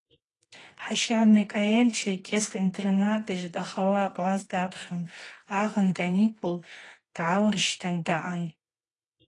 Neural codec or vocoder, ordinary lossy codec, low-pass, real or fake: codec, 24 kHz, 0.9 kbps, WavTokenizer, medium music audio release; AAC, 32 kbps; 10.8 kHz; fake